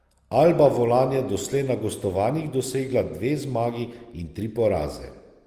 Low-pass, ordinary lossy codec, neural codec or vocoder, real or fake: 14.4 kHz; Opus, 24 kbps; none; real